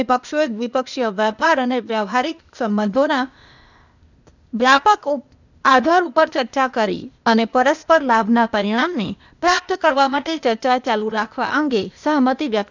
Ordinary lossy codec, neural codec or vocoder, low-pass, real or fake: none; codec, 16 kHz, 0.8 kbps, ZipCodec; 7.2 kHz; fake